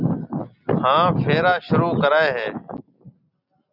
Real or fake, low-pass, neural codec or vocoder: real; 5.4 kHz; none